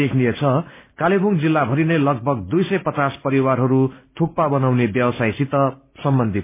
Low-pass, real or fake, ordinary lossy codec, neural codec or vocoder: 3.6 kHz; real; MP3, 24 kbps; none